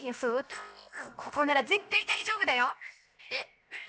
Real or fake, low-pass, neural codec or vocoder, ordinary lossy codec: fake; none; codec, 16 kHz, 0.7 kbps, FocalCodec; none